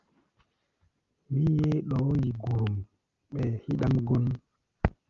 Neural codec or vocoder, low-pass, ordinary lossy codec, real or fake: none; 7.2 kHz; Opus, 32 kbps; real